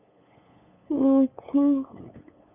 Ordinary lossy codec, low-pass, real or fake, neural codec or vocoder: none; 3.6 kHz; fake; codec, 16 kHz, 8 kbps, FunCodec, trained on LibriTTS, 25 frames a second